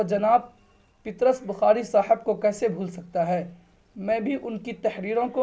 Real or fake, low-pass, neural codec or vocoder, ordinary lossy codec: real; none; none; none